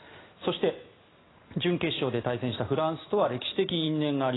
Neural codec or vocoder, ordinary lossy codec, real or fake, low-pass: none; AAC, 16 kbps; real; 7.2 kHz